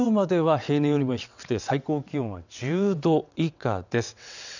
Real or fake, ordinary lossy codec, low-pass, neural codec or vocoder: fake; none; 7.2 kHz; vocoder, 22.05 kHz, 80 mel bands, WaveNeXt